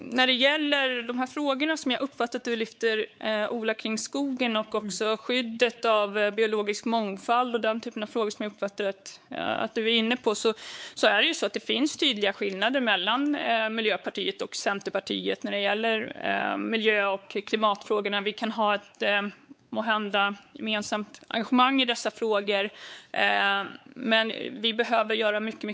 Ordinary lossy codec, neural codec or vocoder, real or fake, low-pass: none; codec, 16 kHz, 4 kbps, X-Codec, WavLM features, trained on Multilingual LibriSpeech; fake; none